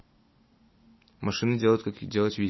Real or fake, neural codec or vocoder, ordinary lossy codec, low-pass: real; none; MP3, 24 kbps; 7.2 kHz